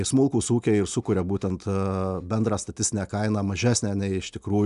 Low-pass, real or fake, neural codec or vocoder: 10.8 kHz; real; none